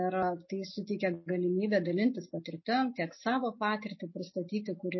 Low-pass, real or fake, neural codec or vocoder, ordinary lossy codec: 7.2 kHz; real; none; MP3, 24 kbps